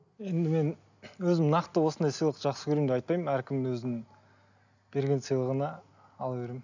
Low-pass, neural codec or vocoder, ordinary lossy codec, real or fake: 7.2 kHz; none; none; real